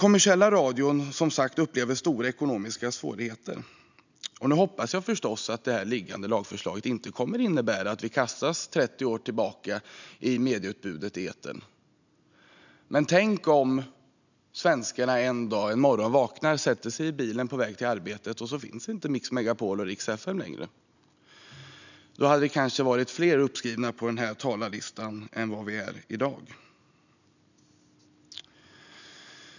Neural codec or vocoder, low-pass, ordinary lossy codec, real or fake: none; 7.2 kHz; none; real